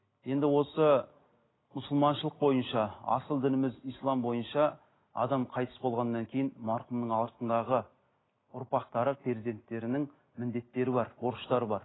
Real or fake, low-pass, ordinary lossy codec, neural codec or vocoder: real; 7.2 kHz; AAC, 16 kbps; none